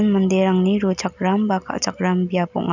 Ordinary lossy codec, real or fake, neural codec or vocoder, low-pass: none; real; none; 7.2 kHz